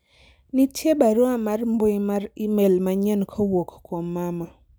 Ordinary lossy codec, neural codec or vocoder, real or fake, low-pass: none; none; real; none